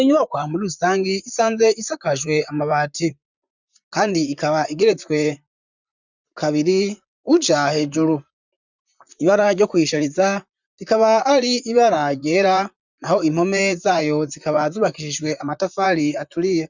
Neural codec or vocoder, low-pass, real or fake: vocoder, 44.1 kHz, 128 mel bands, Pupu-Vocoder; 7.2 kHz; fake